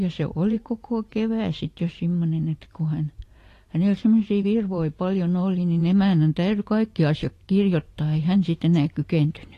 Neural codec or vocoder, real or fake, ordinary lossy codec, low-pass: vocoder, 44.1 kHz, 128 mel bands every 512 samples, BigVGAN v2; fake; AAC, 48 kbps; 14.4 kHz